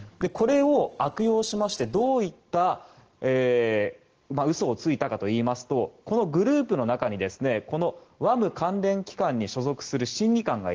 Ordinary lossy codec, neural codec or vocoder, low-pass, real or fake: Opus, 16 kbps; none; 7.2 kHz; real